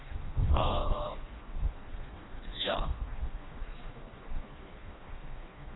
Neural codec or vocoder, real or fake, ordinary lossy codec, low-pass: codec, 16 kHz, 2 kbps, FreqCodec, smaller model; fake; AAC, 16 kbps; 7.2 kHz